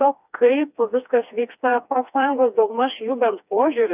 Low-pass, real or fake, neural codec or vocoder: 3.6 kHz; fake; codec, 16 kHz, 2 kbps, FreqCodec, smaller model